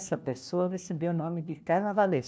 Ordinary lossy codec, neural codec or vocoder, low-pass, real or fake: none; codec, 16 kHz, 1 kbps, FunCodec, trained on LibriTTS, 50 frames a second; none; fake